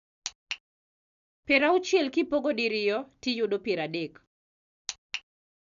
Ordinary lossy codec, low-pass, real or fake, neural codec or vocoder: none; 7.2 kHz; real; none